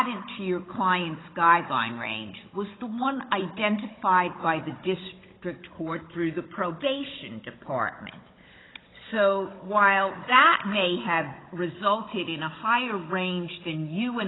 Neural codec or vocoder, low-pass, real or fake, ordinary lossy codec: codec, 16 kHz, 16 kbps, FunCodec, trained on Chinese and English, 50 frames a second; 7.2 kHz; fake; AAC, 16 kbps